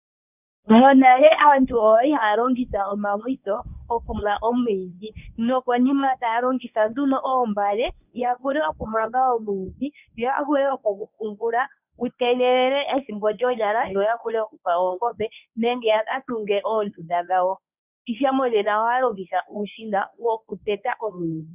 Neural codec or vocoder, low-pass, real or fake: codec, 24 kHz, 0.9 kbps, WavTokenizer, medium speech release version 1; 3.6 kHz; fake